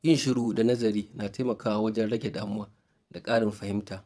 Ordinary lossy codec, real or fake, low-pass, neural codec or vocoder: none; fake; none; vocoder, 22.05 kHz, 80 mel bands, WaveNeXt